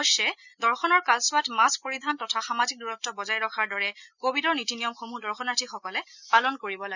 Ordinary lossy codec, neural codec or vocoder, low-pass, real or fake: none; none; 7.2 kHz; real